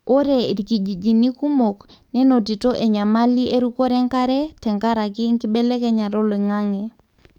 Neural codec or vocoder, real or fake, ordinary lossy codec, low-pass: codec, 44.1 kHz, 7.8 kbps, DAC; fake; none; 19.8 kHz